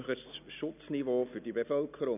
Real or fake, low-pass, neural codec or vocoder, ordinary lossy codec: real; 3.6 kHz; none; none